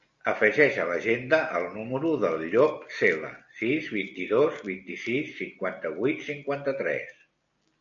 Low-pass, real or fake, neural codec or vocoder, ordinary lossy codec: 7.2 kHz; real; none; AAC, 64 kbps